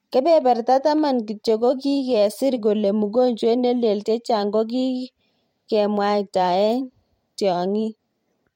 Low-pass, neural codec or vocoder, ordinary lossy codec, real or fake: 19.8 kHz; vocoder, 44.1 kHz, 128 mel bands every 512 samples, BigVGAN v2; MP3, 64 kbps; fake